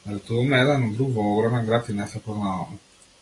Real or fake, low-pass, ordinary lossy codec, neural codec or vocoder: real; 10.8 kHz; AAC, 32 kbps; none